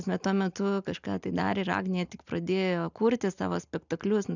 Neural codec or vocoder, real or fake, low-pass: none; real; 7.2 kHz